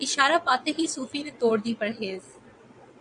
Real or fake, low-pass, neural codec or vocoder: fake; 9.9 kHz; vocoder, 22.05 kHz, 80 mel bands, WaveNeXt